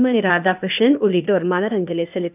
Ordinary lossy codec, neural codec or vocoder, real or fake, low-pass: none; codec, 16 kHz, 0.8 kbps, ZipCodec; fake; 3.6 kHz